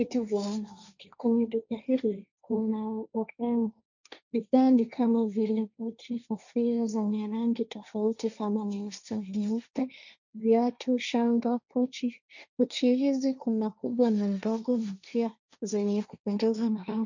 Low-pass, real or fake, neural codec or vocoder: 7.2 kHz; fake; codec, 16 kHz, 1.1 kbps, Voila-Tokenizer